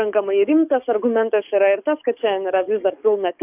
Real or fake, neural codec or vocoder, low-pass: fake; codec, 44.1 kHz, 7.8 kbps, DAC; 3.6 kHz